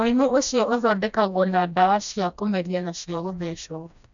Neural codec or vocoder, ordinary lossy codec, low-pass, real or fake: codec, 16 kHz, 1 kbps, FreqCodec, smaller model; none; 7.2 kHz; fake